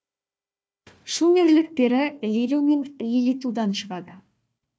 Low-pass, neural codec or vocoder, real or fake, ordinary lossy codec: none; codec, 16 kHz, 1 kbps, FunCodec, trained on Chinese and English, 50 frames a second; fake; none